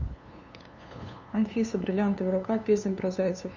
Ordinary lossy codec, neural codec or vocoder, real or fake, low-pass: none; codec, 16 kHz, 2 kbps, FunCodec, trained on LibriTTS, 25 frames a second; fake; 7.2 kHz